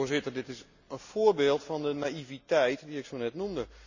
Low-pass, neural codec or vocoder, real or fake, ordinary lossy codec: 7.2 kHz; none; real; none